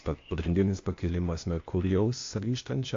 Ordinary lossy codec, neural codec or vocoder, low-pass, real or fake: AAC, 48 kbps; codec, 16 kHz, 0.8 kbps, ZipCodec; 7.2 kHz; fake